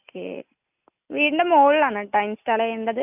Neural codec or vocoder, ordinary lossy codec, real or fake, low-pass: none; none; real; 3.6 kHz